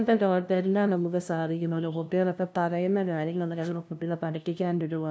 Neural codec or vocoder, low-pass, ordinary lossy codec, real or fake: codec, 16 kHz, 0.5 kbps, FunCodec, trained on LibriTTS, 25 frames a second; none; none; fake